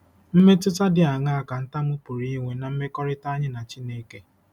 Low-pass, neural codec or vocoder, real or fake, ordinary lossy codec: 19.8 kHz; none; real; none